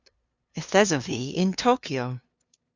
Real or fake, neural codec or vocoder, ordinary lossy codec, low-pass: fake; codec, 16 kHz, 2 kbps, FunCodec, trained on LibriTTS, 25 frames a second; Opus, 64 kbps; 7.2 kHz